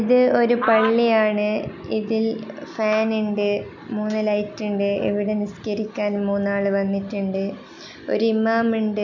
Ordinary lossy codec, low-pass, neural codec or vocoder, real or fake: none; 7.2 kHz; none; real